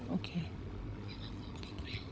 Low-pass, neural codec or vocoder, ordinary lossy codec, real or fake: none; codec, 16 kHz, 16 kbps, FunCodec, trained on LibriTTS, 50 frames a second; none; fake